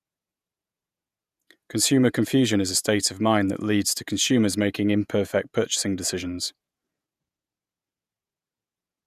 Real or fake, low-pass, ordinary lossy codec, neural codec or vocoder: fake; 14.4 kHz; none; vocoder, 48 kHz, 128 mel bands, Vocos